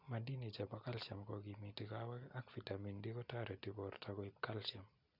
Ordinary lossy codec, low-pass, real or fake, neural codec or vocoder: none; 5.4 kHz; real; none